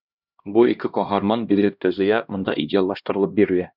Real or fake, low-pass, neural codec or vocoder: fake; 5.4 kHz; codec, 16 kHz, 1 kbps, X-Codec, HuBERT features, trained on LibriSpeech